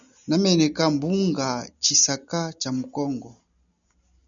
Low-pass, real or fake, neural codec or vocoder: 7.2 kHz; real; none